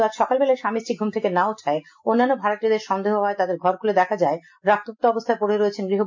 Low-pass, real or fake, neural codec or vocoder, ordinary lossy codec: 7.2 kHz; real; none; MP3, 48 kbps